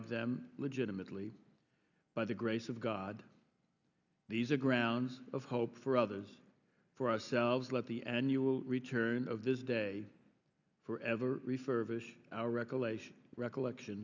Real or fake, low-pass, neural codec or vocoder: real; 7.2 kHz; none